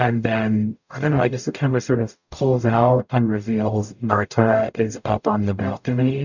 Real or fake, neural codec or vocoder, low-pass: fake; codec, 44.1 kHz, 0.9 kbps, DAC; 7.2 kHz